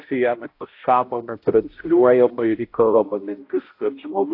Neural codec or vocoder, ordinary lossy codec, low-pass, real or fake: codec, 16 kHz, 0.5 kbps, X-Codec, HuBERT features, trained on balanced general audio; AAC, 32 kbps; 5.4 kHz; fake